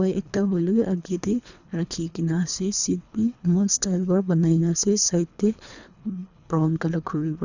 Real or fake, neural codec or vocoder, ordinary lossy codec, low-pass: fake; codec, 24 kHz, 3 kbps, HILCodec; none; 7.2 kHz